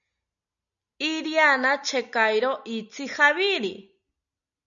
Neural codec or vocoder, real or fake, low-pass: none; real; 7.2 kHz